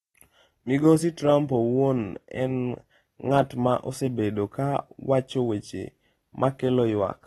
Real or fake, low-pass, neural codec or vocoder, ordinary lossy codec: real; 19.8 kHz; none; AAC, 32 kbps